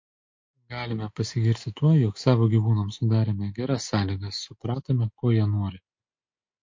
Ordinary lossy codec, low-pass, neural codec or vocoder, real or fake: MP3, 48 kbps; 7.2 kHz; none; real